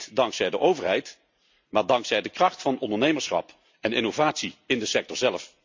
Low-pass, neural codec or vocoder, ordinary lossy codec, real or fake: 7.2 kHz; none; MP3, 64 kbps; real